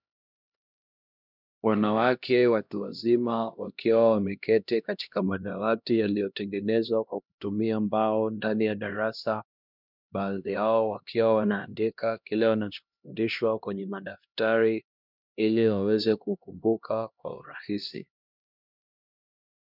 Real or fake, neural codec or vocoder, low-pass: fake; codec, 16 kHz, 1 kbps, X-Codec, HuBERT features, trained on LibriSpeech; 5.4 kHz